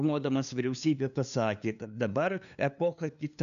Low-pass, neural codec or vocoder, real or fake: 7.2 kHz; codec, 16 kHz, 2 kbps, FunCodec, trained on LibriTTS, 25 frames a second; fake